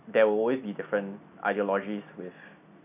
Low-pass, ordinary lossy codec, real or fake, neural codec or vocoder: 3.6 kHz; none; real; none